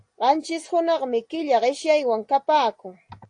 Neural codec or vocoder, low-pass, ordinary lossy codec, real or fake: none; 9.9 kHz; AAC, 48 kbps; real